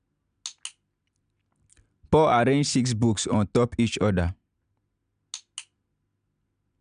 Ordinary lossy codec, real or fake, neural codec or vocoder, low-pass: none; real; none; 9.9 kHz